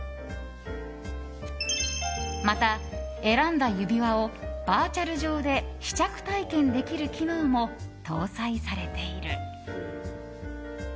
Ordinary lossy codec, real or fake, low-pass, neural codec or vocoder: none; real; none; none